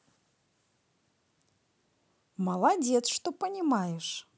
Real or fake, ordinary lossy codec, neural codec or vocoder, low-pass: real; none; none; none